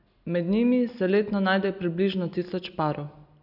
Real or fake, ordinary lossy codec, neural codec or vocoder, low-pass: real; none; none; 5.4 kHz